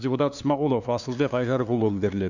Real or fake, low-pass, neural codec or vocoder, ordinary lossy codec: fake; 7.2 kHz; codec, 16 kHz, 2 kbps, FunCodec, trained on LibriTTS, 25 frames a second; none